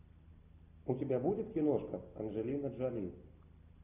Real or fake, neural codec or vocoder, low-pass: real; none; 3.6 kHz